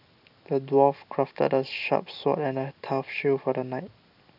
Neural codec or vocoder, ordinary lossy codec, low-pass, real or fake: none; none; 5.4 kHz; real